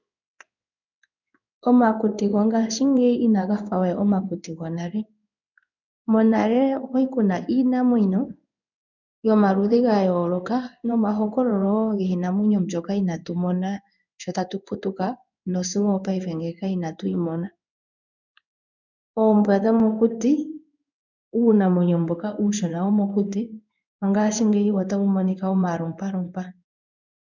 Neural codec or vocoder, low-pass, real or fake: codec, 16 kHz in and 24 kHz out, 1 kbps, XY-Tokenizer; 7.2 kHz; fake